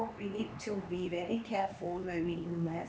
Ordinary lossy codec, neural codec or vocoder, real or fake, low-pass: none; codec, 16 kHz, 2 kbps, X-Codec, HuBERT features, trained on LibriSpeech; fake; none